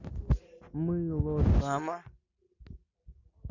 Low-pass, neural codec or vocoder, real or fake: 7.2 kHz; none; real